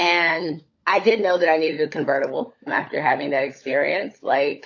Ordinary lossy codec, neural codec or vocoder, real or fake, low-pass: AAC, 48 kbps; codec, 16 kHz, 16 kbps, FunCodec, trained on LibriTTS, 50 frames a second; fake; 7.2 kHz